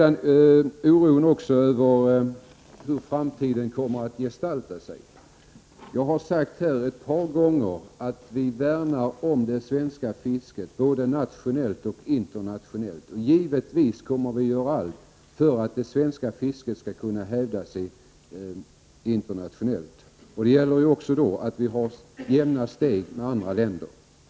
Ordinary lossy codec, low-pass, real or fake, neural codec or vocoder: none; none; real; none